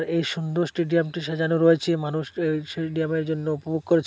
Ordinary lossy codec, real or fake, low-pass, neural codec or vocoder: none; real; none; none